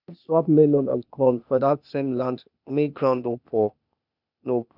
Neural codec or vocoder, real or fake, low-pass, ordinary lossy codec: codec, 16 kHz, 0.8 kbps, ZipCodec; fake; 5.4 kHz; none